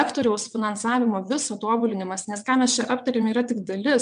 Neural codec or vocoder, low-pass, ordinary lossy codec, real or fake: vocoder, 22.05 kHz, 80 mel bands, WaveNeXt; 9.9 kHz; MP3, 96 kbps; fake